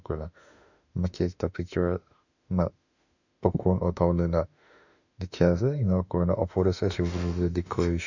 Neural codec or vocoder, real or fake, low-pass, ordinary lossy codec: autoencoder, 48 kHz, 32 numbers a frame, DAC-VAE, trained on Japanese speech; fake; 7.2 kHz; none